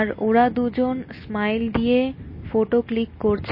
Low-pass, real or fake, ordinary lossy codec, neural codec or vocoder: 5.4 kHz; real; MP3, 24 kbps; none